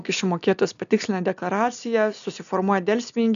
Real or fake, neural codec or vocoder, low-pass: real; none; 7.2 kHz